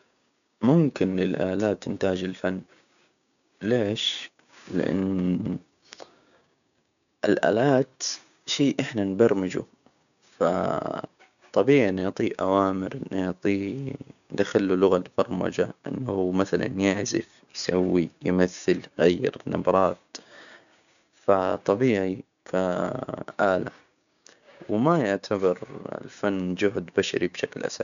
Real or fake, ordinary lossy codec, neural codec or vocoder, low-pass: fake; none; codec, 16 kHz, 6 kbps, DAC; 7.2 kHz